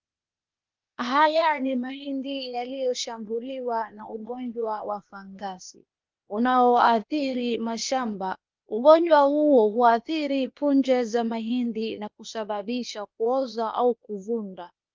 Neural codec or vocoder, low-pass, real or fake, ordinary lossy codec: codec, 16 kHz, 0.8 kbps, ZipCodec; 7.2 kHz; fake; Opus, 24 kbps